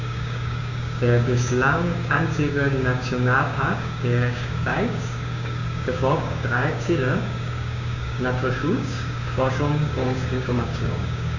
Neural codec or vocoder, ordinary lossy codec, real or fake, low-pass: codec, 16 kHz, 6 kbps, DAC; none; fake; 7.2 kHz